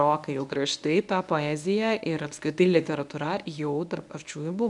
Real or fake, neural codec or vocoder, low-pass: fake; codec, 24 kHz, 0.9 kbps, WavTokenizer, medium speech release version 1; 10.8 kHz